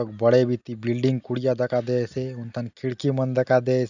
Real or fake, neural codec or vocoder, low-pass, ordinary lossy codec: real; none; 7.2 kHz; none